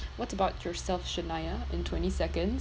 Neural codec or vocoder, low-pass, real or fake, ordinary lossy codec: none; none; real; none